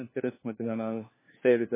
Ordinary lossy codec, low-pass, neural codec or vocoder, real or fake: MP3, 16 kbps; 3.6 kHz; codec, 16 kHz, 1 kbps, FunCodec, trained on LibriTTS, 50 frames a second; fake